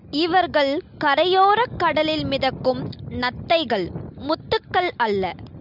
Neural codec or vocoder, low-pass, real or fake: none; 5.4 kHz; real